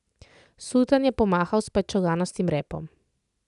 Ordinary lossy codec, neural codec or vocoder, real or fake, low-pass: none; none; real; 10.8 kHz